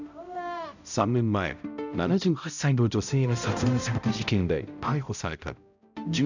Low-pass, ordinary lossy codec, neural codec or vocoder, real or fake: 7.2 kHz; none; codec, 16 kHz, 0.5 kbps, X-Codec, HuBERT features, trained on balanced general audio; fake